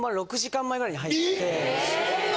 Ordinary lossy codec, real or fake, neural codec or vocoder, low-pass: none; real; none; none